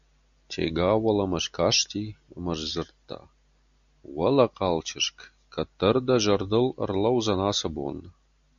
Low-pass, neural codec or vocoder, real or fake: 7.2 kHz; none; real